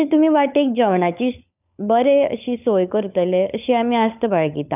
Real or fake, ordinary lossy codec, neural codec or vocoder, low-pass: fake; none; codec, 16 kHz, 16 kbps, FunCodec, trained on LibriTTS, 50 frames a second; 3.6 kHz